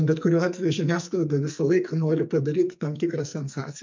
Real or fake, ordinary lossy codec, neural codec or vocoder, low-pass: fake; MP3, 64 kbps; codec, 44.1 kHz, 2.6 kbps, SNAC; 7.2 kHz